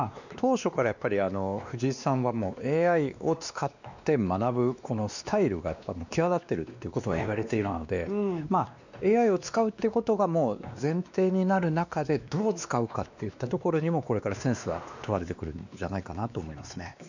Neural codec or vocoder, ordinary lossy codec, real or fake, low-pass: codec, 16 kHz, 2 kbps, X-Codec, WavLM features, trained on Multilingual LibriSpeech; none; fake; 7.2 kHz